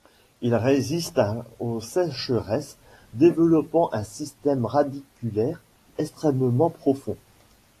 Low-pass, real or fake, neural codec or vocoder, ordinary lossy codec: 14.4 kHz; real; none; AAC, 48 kbps